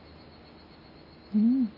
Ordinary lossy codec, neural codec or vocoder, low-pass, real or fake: none; none; 5.4 kHz; real